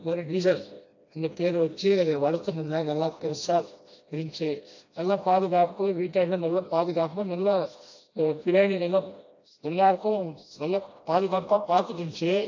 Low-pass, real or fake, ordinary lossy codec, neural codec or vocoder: 7.2 kHz; fake; AAC, 48 kbps; codec, 16 kHz, 1 kbps, FreqCodec, smaller model